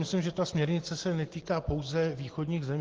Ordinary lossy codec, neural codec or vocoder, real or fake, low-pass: Opus, 16 kbps; none; real; 7.2 kHz